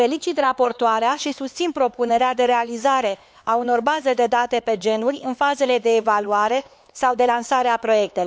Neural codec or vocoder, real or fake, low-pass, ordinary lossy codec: codec, 16 kHz, 4 kbps, X-Codec, HuBERT features, trained on LibriSpeech; fake; none; none